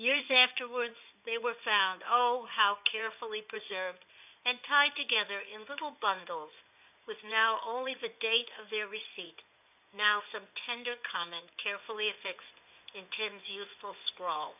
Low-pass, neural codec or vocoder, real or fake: 3.6 kHz; codec, 16 kHz in and 24 kHz out, 2.2 kbps, FireRedTTS-2 codec; fake